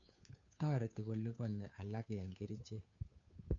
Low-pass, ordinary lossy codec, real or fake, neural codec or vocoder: 7.2 kHz; none; fake; codec, 16 kHz, 2 kbps, FunCodec, trained on Chinese and English, 25 frames a second